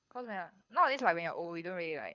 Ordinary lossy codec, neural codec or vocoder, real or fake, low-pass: Opus, 64 kbps; codec, 24 kHz, 6 kbps, HILCodec; fake; 7.2 kHz